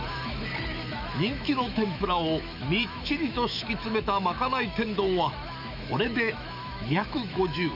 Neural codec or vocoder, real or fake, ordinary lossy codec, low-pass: autoencoder, 48 kHz, 128 numbers a frame, DAC-VAE, trained on Japanese speech; fake; none; 5.4 kHz